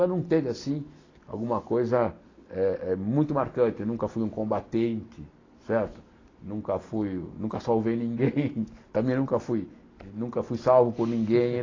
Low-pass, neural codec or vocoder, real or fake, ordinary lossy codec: 7.2 kHz; none; real; AAC, 32 kbps